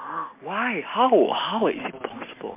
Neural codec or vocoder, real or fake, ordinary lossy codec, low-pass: none; real; MP3, 24 kbps; 3.6 kHz